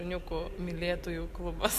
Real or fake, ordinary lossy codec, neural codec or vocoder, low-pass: real; MP3, 96 kbps; none; 14.4 kHz